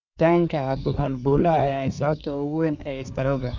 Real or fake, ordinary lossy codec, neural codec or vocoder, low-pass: fake; none; codec, 24 kHz, 1 kbps, SNAC; 7.2 kHz